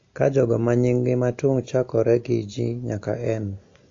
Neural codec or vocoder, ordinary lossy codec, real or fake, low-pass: none; AAC, 48 kbps; real; 7.2 kHz